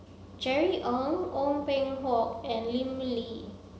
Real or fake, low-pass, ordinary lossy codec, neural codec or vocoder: real; none; none; none